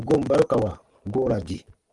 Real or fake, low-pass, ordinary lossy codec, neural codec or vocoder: real; 10.8 kHz; Opus, 24 kbps; none